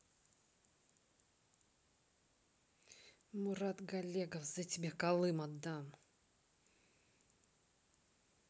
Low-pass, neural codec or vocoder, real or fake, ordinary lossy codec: none; none; real; none